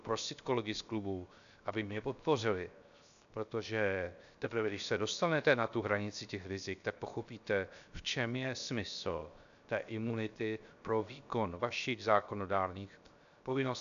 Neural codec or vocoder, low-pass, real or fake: codec, 16 kHz, 0.7 kbps, FocalCodec; 7.2 kHz; fake